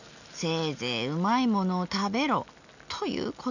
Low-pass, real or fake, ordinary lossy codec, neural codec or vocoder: 7.2 kHz; real; none; none